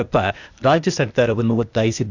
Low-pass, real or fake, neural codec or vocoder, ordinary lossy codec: 7.2 kHz; fake; codec, 16 kHz, 0.8 kbps, ZipCodec; none